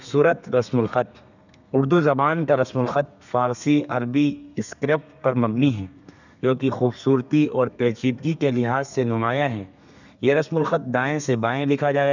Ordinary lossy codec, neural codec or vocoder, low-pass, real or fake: none; codec, 32 kHz, 1.9 kbps, SNAC; 7.2 kHz; fake